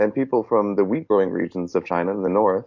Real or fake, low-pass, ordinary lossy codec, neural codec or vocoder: real; 7.2 kHz; MP3, 48 kbps; none